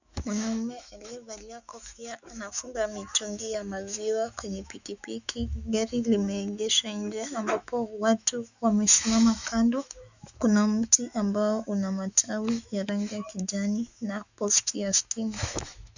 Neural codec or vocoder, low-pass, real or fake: autoencoder, 48 kHz, 128 numbers a frame, DAC-VAE, trained on Japanese speech; 7.2 kHz; fake